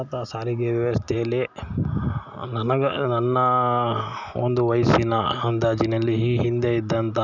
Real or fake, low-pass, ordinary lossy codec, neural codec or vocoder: real; 7.2 kHz; none; none